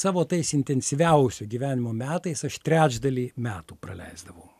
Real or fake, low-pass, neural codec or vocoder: real; 14.4 kHz; none